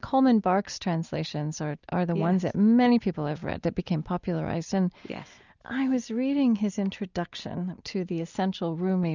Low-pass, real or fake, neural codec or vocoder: 7.2 kHz; real; none